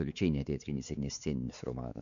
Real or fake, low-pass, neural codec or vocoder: fake; 7.2 kHz; codec, 16 kHz, 4 kbps, X-Codec, WavLM features, trained on Multilingual LibriSpeech